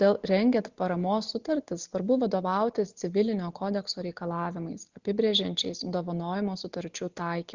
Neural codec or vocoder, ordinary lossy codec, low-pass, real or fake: none; Opus, 64 kbps; 7.2 kHz; real